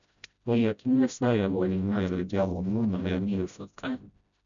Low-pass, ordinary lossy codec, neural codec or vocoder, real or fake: 7.2 kHz; none; codec, 16 kHz, 0.5 kbps, FreqCodec, smaller model; fake